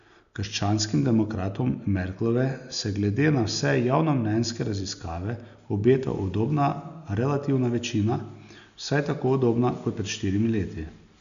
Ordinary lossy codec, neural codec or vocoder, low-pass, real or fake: none; none; 7.2 kHz; real